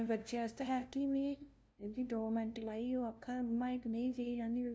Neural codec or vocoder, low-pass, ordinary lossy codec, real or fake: codec, 16 kHz, 0.5 kbps, FunCodec, trained on LibriTTS, 25 frames a second; none; none; fake